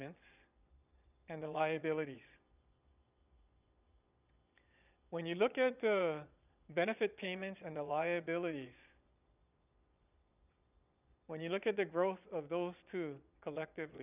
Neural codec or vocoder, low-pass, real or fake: vocoder, 22.05 kHz, 80 mel bands, Vocos; 3.6 kHz; fake